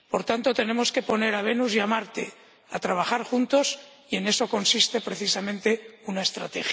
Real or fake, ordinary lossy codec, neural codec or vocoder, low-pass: real; none; none; none